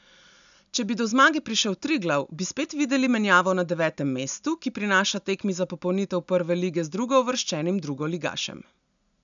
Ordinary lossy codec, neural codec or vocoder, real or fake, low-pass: none; none; real; 7.2 kHz